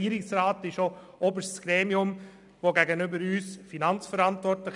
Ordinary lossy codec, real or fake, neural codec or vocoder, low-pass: none; real; none; 10.8 kHz